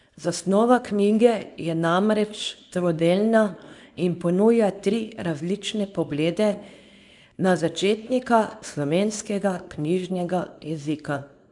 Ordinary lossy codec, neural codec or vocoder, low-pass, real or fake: none; codec, 24 kHz, 0.9 kbps, WavTokenizer, small release; 10.8 kHz; fake